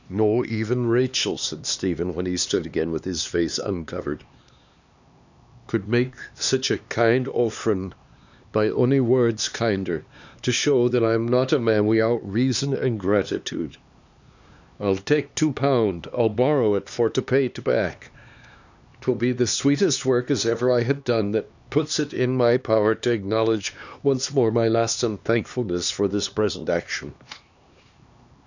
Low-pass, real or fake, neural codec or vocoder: 7.2 kHz; fake; codec, 16 kHz, 2 kbps, X-Codec, HuBERT features, trained on LibriSpeech